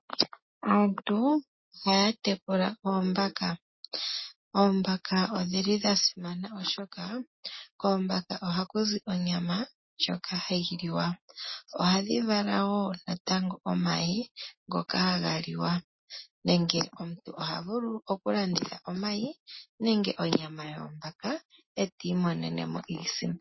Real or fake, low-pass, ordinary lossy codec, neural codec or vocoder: real; 7.2 kHz; MP3, 24 kbps; none